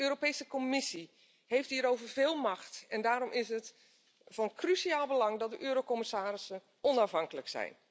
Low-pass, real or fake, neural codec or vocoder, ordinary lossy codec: none; real; none; none